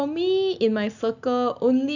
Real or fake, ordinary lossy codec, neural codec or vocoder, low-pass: real; none; none; 7.2 kHz